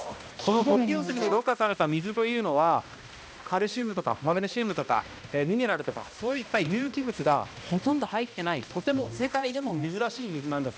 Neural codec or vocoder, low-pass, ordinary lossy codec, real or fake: codec, 16 kHz, 1 kbps, X-Codec, HuBERT features, trained on balanced general audio; none; none; fake